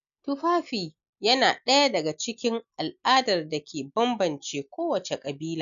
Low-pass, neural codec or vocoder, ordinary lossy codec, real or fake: 7.2 kHz; none; none; real